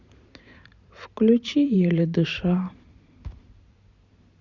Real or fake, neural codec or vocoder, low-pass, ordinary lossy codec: fake; vocoder, 44.1 kHz, 128 mel bands every 512 samples, BigVGAN v2; 7.2 kHz; Opus, 64 kbps